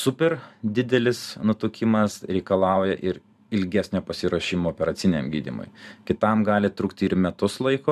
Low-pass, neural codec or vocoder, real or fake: 14.4 kHz; vocoder, 44.1 kHz, 128 mel bands every 512 samples, BigVGAN v2; fake